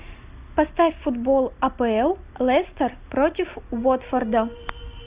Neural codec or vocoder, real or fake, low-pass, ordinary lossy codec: none; real; 3.6 kHz; Opus, 64 kbps